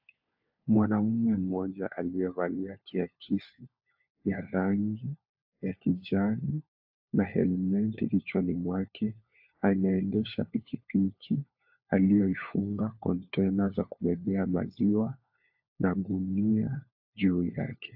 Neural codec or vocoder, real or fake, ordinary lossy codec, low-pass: codec, 16 kHz, 4 kbps, FunCodec, trained on LibriTTS, 50 frames a second; fake; AAC, 48 kbps; 5.4 kHz